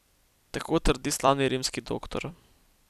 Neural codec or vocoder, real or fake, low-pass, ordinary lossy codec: vocoder, 44.1 kHz, 128 mel bands every 256 samples, BigVGAN v2; fake; 14.4 kHz; none